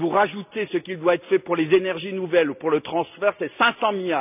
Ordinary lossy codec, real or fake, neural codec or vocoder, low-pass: none; real; none; 3.6 kHz